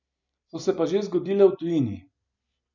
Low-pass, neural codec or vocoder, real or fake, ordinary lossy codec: 7.2 kHz; none; real; none